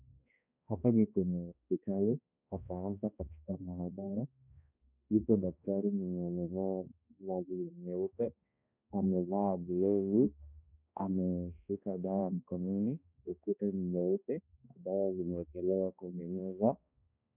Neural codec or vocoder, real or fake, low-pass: codec, 16 kHz, 1 kbps, X-Codec, HuBERT features, trained on balanced general audio; fake; 3.6 kHz